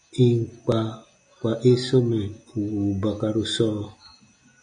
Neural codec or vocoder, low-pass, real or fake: none; 9.9 kHz; real